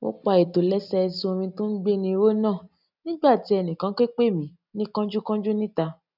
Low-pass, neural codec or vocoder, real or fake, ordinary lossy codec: 5.4 kHz; none; real; none